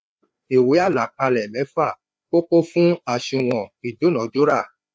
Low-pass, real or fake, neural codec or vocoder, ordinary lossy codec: none; fake; codec, 16 kHz, 4 kbps, FreqCodec, larger model; none